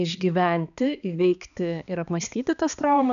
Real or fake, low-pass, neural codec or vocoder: fake; 7.2 kHz; codec, 16 kHz, 2 kbps, X-Codec, HuBERT features, trained on balanced general audio